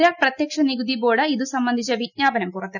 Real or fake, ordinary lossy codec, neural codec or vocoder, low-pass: real; none; none; 7.2 kHz